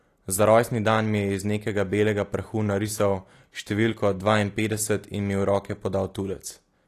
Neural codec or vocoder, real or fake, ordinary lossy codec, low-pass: none; real; AAC, 48 kbps; 14.4 kHz